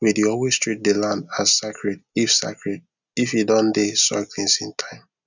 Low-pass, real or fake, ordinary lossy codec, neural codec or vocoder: 7.2 kHz; real; none; none